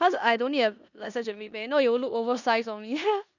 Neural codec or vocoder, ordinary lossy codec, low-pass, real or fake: codec, 16 kHz in and 24 kHz out, 0.9 kbps, LongCat-Audio-Codec, four codebook decoder; none; 7.2 kHz; fake